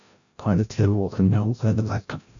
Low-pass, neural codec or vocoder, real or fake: 7.2 kHz; codec, 16 kHz, 0.5 kbps, FreqCodec, larger model; fake